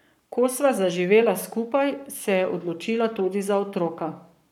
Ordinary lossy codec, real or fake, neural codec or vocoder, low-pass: none; fake; codec, 44.1 kHz, 7.8 kbps, Pupu-Codec; 19.8 kHz